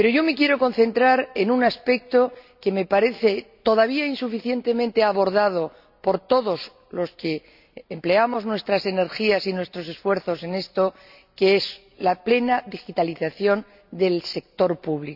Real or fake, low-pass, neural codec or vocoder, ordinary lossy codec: real; 5.4 kHz; none; none